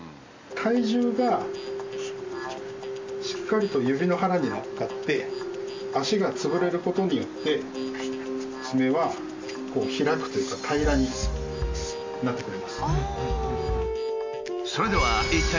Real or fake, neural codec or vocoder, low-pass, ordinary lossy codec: real; none; 7.2 kHz; MP3, 48 kbps